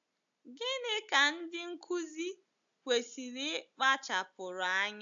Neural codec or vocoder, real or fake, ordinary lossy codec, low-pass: none; real; AAC, 64 kbps; 7.2 kHz